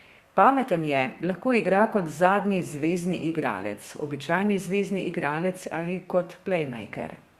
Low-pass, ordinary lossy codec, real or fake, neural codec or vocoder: 14.4 kHz; Opus, 64 kbps; fake; codec, 32 kHz, 1.9 kbps, SNAC